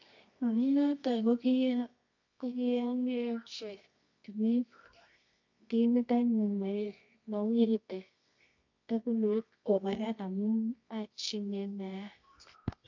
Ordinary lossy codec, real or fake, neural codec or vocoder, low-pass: MP3, 48 kbps; fake; codec, 24 kHz, 0.9 kbps, WavTokenizer, medium music audio release; 7.2 kHz